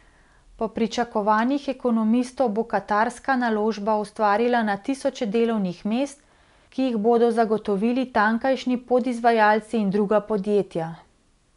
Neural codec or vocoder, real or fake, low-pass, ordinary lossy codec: none; real; 10.8 kHz; none